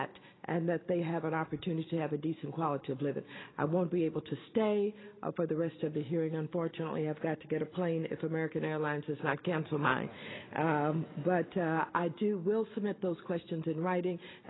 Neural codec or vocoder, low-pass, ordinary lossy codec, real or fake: none; 7.2 kHz; AAC, 16 kbps; real